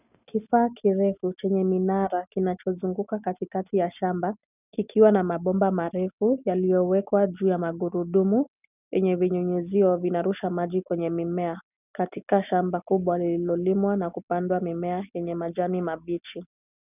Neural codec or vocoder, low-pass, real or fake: none; 3.6 kHz; real